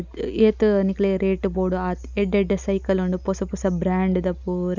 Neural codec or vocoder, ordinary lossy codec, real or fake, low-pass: none; none; real; 7.2 kHz